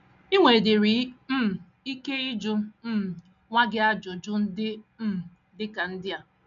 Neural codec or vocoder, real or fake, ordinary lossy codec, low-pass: none; real; none; 7.2 kHz